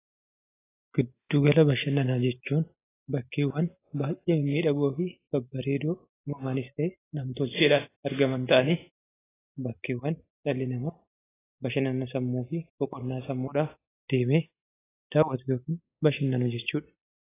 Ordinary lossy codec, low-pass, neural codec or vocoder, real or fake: AAC, 16 kbps; 3.6 kHz; none; real